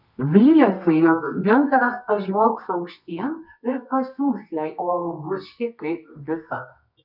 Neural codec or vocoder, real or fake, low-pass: codec, 24 kHz, 0.9 kbps, WavTokenizer, medium music audio release; fake; 5.4 kHz